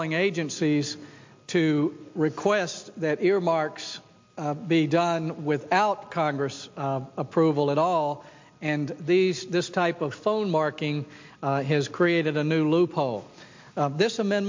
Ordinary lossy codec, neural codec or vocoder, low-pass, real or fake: MP3, 48 kbps; none; 7.2 kHz; real